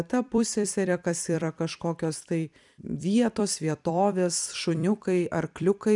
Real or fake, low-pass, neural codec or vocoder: fake; 10.8 kHz; vocoder, 44.1 kHz, 128 mel bands every 256 samples, BigVGAN v2